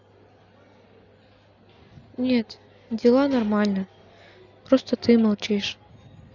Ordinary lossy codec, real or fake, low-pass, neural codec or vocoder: none; real; 7.2 kHz; none